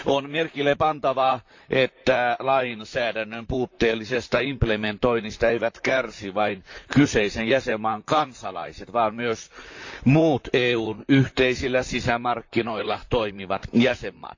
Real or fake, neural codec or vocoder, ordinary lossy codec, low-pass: fake; vocoder, 44.1 kHz, 128 mel bands, Pupu-Vocoder; AAC, 48 kbps; 7.2 kHz